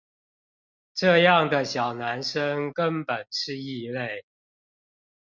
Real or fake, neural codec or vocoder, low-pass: real; none; 7.2 kHz